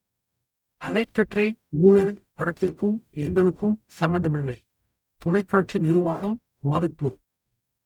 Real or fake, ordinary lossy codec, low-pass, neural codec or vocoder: fake; none; 19.8 kHz; codec, 44.1 kHz, 0.9 kbps, DAC